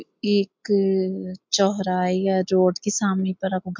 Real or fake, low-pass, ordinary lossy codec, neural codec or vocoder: real; 7.2 kHz; MP3, 64 kbps; none